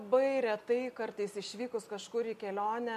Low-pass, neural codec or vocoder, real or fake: 14.4 kHz; none; real